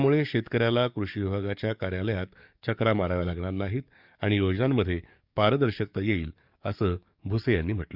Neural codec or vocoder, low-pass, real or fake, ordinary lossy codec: codec, 44.1 kHz, 7.8 kbps, DAC; 5.4 kHz; fake; none